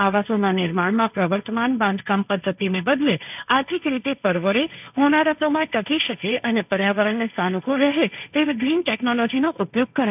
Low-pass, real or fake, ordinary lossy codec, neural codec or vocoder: 3.6 kHz; fake; none; codec, 16 kHz, 1.1 kbps, Voila-Tokenizer